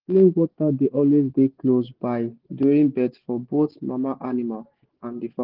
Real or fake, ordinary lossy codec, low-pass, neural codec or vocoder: real; Opus, 16 kbps; 5.4 kHz; none